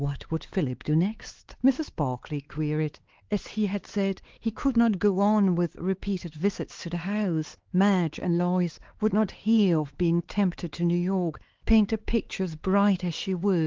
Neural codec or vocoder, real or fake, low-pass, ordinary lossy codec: codec, 16 kHz, 4 kbps, X-Codec, HuBERT features, trained on LibriSpeech; fake; 7.2 kHz; Opus, 16 kbps